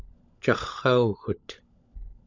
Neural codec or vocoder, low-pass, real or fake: codec, 16 kHz, 16 kbps, FunCodec, trained on LibriTTS, 50 frames a second; 7.2 kHz; fake